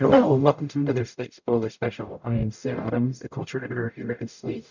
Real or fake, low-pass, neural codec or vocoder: fake; 7.2 kHz; codec, 44.1 kHz, 0.9 kbps, DAC